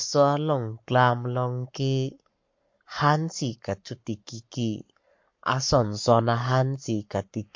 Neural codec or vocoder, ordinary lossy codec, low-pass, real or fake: codec, 44.1 kHz, 7.8 kbps, Pupu-Codec; MP3, 48 kbps; 7.2 kHz; fake